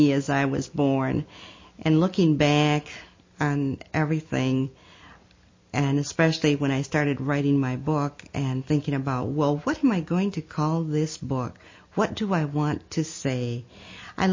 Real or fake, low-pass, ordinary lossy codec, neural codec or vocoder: real; 7.2 kHz; MP3, 32 kbps; none